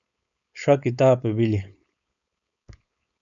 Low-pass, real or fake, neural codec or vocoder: 7.2 kHz; fake; codec, 16 kHz, 4.8 kbps, FACodec